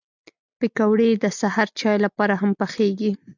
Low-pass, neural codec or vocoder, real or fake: 7.2 kHz; none; real